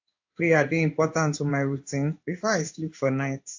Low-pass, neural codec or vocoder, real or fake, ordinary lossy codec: 7.2 kHz; codec, 16 kHz in and 24 kHz out, 1 kbps, XY-Tokenizer; fake; AAC, 48 kbps